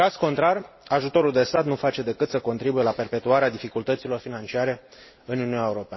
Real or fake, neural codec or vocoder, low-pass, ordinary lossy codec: real; none; 7.2 kHz; MP3, 24 kbps